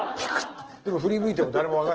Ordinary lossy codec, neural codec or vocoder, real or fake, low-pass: Opus, 16 kbps; none; real; 7.2 kHz